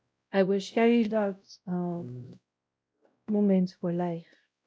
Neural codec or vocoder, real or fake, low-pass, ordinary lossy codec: codec, 16 kHz, 0.5 kbps, X-Codec, WavLM features, trained on Multilingual LibriSpeech; fake; none; none